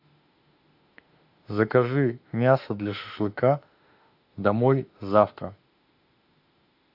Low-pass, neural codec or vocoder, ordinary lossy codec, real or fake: 5.4 kHz; autoencoder, 48 kHz, 32 numbers a frame, DAC-VAE, trained on Japanese speech; AAC, 48 kbps; fake